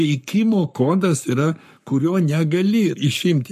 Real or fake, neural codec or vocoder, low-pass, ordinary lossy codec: fake; codec, 44.1 kHz, 7.8 kbps, Pupu-Codec; 14.4 kHz; MP3, 64 kbps